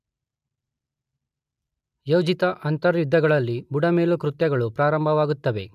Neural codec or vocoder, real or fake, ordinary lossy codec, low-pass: none; real; MP3, 96 kbps; 14.4 kHz